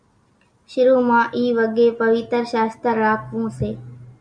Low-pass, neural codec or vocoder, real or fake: 9.9 kHz; none; real